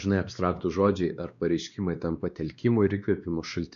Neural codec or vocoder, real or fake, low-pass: codec, 16 kHz, 2 kbps, X-Codec, WavLM features, trained on Multilingual LibriSpeech; fake; 7.2 kHz